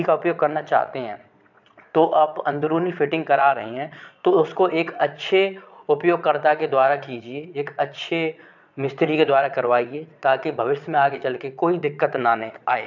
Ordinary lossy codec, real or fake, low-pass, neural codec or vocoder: none; fake; 7.2 kHz; vocoder, 22.05 kHz, 80 mel bands, Vocos